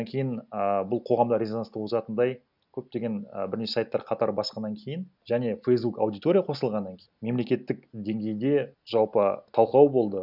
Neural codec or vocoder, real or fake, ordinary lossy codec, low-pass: none; real; none; 5.4 kHz